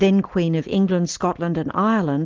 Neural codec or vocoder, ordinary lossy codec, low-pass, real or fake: none; Opus, 16 kbps; 7.2 kHz; real